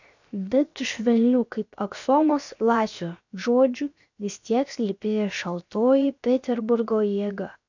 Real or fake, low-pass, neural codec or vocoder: fake; 7.2 kHz; codec, 16 kHz, 0.7 kbps, FocalCodec